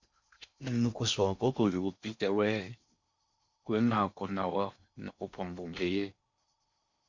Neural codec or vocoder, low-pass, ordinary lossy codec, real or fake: codec, 16 kHz in and 24 kHz out, 0.6 kbps, FocalCodec, streaming, 4096 codes; 7.2 kHz; Opus, 64 kbps; fake